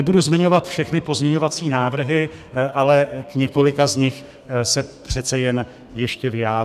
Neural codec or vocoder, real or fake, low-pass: codec, 32 kHz, 1.9 kbps, SNAC; fake; 14.4 kHz